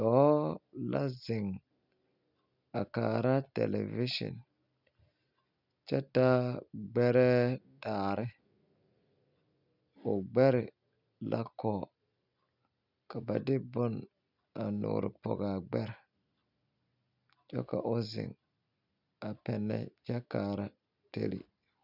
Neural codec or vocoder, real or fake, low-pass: none; real; 5.4 kHz